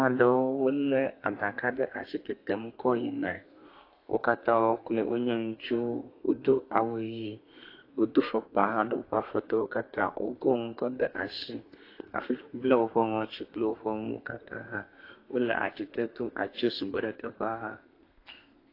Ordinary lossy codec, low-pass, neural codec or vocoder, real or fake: AAC, 32 kbps; 5.4 kHz; codec, 32 kHz, 1.9 kbps, SNAC; fake